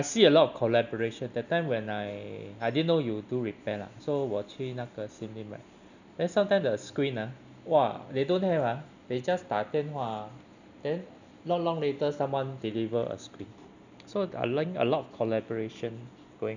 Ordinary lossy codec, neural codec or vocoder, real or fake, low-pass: none; none; real; 7.2 kHz